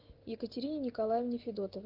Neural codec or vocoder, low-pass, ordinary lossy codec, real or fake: none; 5.4 kHz; Opus, 16 kbps; real